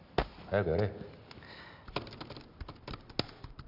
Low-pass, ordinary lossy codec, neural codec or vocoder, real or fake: 5.4 kHz; none; none; real